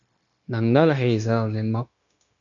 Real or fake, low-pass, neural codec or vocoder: fake; 7.2 kHz; codec, 16 kHz, 0.9 kbps, LongCat-Audio-Codec